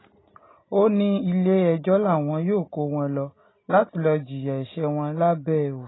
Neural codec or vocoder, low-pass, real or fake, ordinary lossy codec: none; 7.2 kHz; real; AAC, 16 kbps